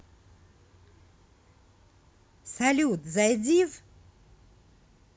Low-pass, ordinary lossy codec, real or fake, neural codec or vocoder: none; none; real; none